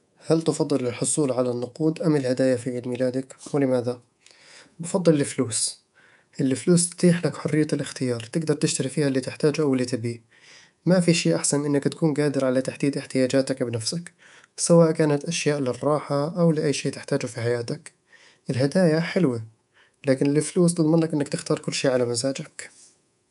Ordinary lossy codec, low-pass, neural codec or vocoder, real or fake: none; 10.8 kHz; codec, 24 kHz, 3.1 kbps, DualCodec; fake